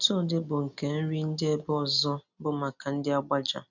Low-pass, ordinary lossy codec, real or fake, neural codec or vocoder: 7.2 kHz; none; real; none